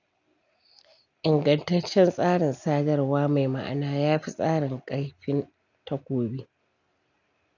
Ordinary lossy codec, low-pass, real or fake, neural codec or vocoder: none; 7.2 kHz; real; none